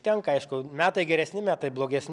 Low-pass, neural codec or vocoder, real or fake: 10.8 kHz; vocoder, 44.1 kHz, 128 mel bands every 512 samples, BigVGAN v2; fake